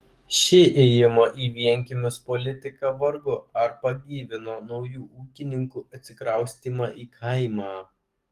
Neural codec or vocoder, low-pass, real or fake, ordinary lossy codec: codec, 44.1 kHz, 7.8 kbps, DAC; 14.4 kHz; fake; Opus, 24 kbps